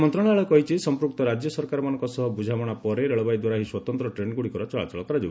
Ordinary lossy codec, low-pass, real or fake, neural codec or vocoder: none; none; real; none